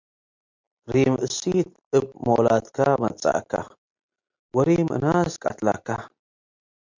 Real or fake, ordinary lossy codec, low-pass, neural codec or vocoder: real; MP3, 64 kbps; 7.2 kHz; none